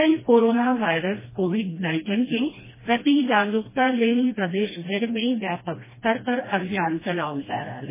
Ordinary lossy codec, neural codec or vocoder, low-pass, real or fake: MP3, 16 kbps; codec, 16 kHz, 1 kbps, FreqCodec, smaller model; 3.6 kHz; fake